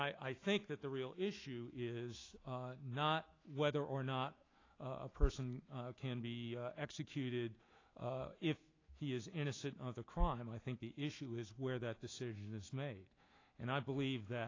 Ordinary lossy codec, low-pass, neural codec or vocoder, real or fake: AAC, 32 kbps; 7.2 kHz; autoencoder, 48 kHz, 128 numbers a frame, DAC-VAE, trained on Japanese speech; fake